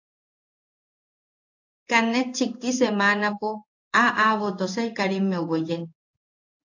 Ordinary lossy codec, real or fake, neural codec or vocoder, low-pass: AAC, 48 kbps; fake; codec, 16 kHz in and 24 kHz out, 1 kbps, XY-Tokenizer; 7.2 kHz